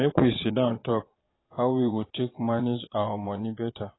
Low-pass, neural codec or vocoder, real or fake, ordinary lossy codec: 7.2 kHz; vocoder, 22.05 kHz, 80 mel bands, Vocos; fake; AAC, 16 kbps